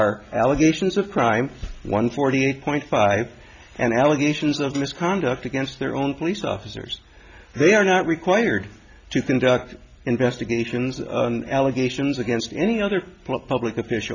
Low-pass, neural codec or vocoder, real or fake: 7.2 kHz; none; real